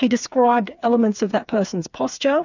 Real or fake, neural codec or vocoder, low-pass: fake; codec, 16 kHz, 4 kbps, FreqCodec, smaller model; 7.2 kHz